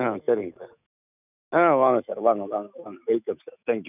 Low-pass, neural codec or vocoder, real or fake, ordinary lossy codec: 3.6 kHz; autoencoder, 48 kHz, 128 numbers a frame, DAC-VAE, trained on Japanese speech; fake; none